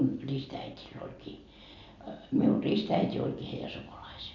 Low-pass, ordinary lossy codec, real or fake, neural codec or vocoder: 7.2 kHz; none; real; none